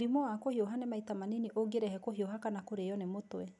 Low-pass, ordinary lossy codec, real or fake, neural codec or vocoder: 10.8 kHz; none; real; none